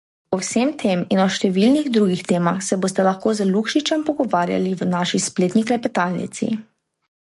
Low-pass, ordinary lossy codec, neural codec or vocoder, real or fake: 14.4 kHz; MP3, 48 kbps; codec, 44.1 kHz, 7.8 kbps, DAC; fake